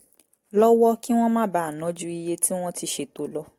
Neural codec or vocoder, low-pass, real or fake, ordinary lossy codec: none; 19.8 kHz; real; AAC, 48 kbps